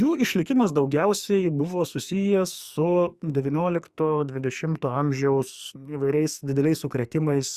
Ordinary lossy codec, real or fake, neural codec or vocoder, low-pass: Opus, 64 kbps; fake; codec, 44.1 kHz, 2.6 kbps, SNAC; 14.4 kHz